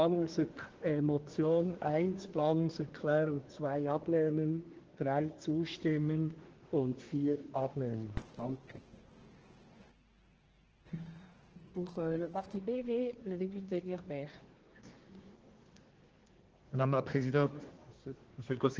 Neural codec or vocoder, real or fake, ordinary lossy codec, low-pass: codec, 24 kHz, 1 kbps, SNAC; fake; Opus, 16 kbps; 7.2 kHz